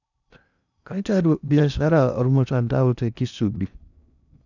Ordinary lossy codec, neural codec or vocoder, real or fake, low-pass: none; codec, 16 kHz in and 24 kHz out, 0.6 kbps, FocalCodec, streaming, 4096 codes; fake; 7.2 kHz